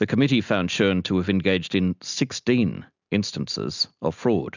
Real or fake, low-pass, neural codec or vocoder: real; 7.2 kHz; none